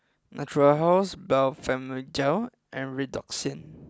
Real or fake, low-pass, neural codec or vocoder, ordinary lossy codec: real; none; none; none